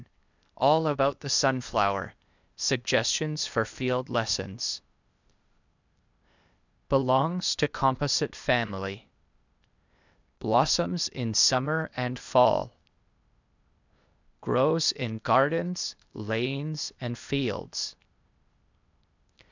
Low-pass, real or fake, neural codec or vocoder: 7.2 kHz; fake; codec, 16 kHz, 0.8 kbps, ZipCodec